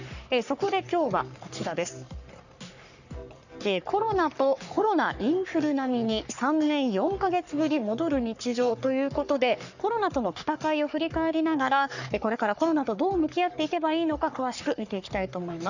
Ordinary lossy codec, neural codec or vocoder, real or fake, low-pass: none; codec, 44.1 kHz, 3.4 kbps, Pupu-Codec; fake; 7.2 kHz